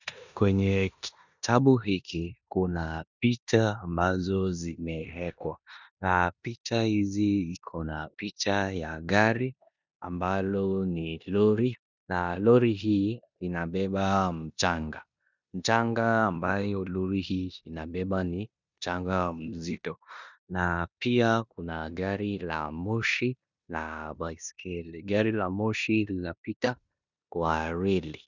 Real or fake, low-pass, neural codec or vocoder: fake; 7.2 kHz; codec, 16 kHz in and 24 kHz out, 0.9 kbps, LongCat-Audio-Codec, four codebook decoder